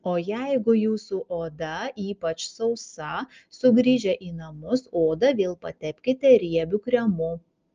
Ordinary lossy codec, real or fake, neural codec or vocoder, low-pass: Opus, 24 kbps; real; none; 7.2 kHz